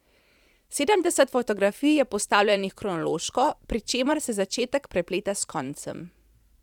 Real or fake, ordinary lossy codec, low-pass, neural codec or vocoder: fake; none; 19.8 kHz; vocoder, 44.1 kHz, 128 mel bands every 512 samples, BigVGAN v2